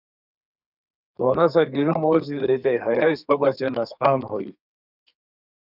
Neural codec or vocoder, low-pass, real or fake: codec, 32 kHz, 1.9 kbps, SNAC; 5.4 kHz; fake